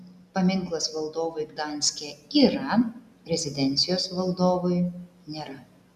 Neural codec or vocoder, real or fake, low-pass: none; real; 14.4 kHz